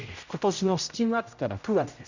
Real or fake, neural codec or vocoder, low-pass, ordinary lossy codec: fake; codec, 16 kHz, 0.5 kbps, X-Codec, HuBERT features, trained on general audio; 7.2 kHz; none